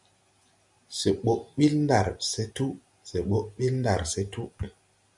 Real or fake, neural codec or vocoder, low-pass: real; none; 10.8 kHz